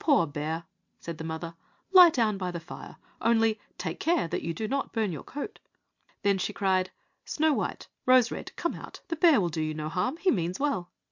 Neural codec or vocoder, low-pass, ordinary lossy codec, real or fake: none; 7.2 kHz; MP3, 64 kbps; real